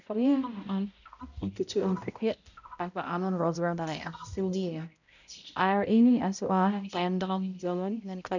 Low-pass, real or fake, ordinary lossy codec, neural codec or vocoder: 7.2 kHz; fake; none; codec, 16 kHz, 0.5 kbps, X-Codec, HuBERT features, trained on balanced general audio